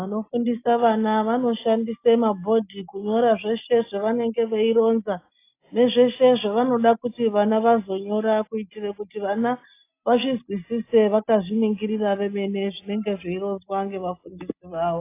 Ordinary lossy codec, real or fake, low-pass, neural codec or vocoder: AAC, 24 kbps; real; 3.6 kHz; none